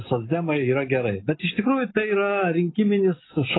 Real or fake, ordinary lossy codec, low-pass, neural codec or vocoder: real; AAC, 16 kbps; 7.2 kHz; none